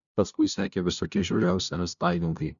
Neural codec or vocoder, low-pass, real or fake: codec, 16 kHz, 0.5 kbps, FunCodec, trained on LibriTTS, 25 frames a second; 7.2 kHz; fake